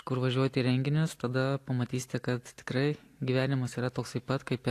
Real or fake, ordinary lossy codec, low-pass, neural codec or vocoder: real; AAC, 64 kbps; 14.4 kHz; none